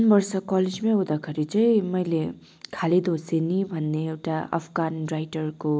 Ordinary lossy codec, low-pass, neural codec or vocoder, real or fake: none; none; none; real